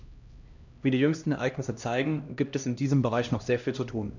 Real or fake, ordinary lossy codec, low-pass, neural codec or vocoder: fake; none; 7.2 kHz; codec, 16 kHz, 1 kbps, X-Codec, HuBERT features, trained on LibriSpeech